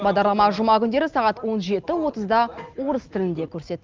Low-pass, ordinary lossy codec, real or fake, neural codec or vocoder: 7.2 kHz; Opus, 32 kbps; real; none